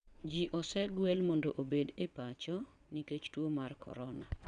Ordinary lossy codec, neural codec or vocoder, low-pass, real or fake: none; vocoder, 22.05 kHz, 80 mel bands, WaveNeXt; 9.9 kHz; fake